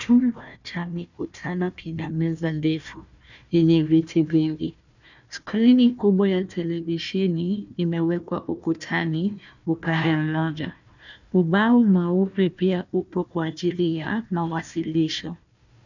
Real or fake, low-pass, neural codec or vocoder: fake; 7.2 kHz; codec, 16 kHz, 1 kbps, FunCodec, trained on Chinese and English, 50 frames a second